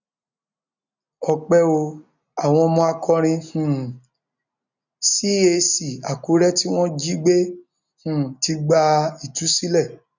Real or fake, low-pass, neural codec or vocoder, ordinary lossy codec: real; 7.2 kHz; none; none